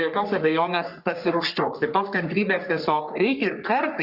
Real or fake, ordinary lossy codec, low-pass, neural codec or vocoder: fake; Opus, 64 kbps; 5.4 kHz; codec, 44.1 kHz, 3.4 kbps, Pupu-Codec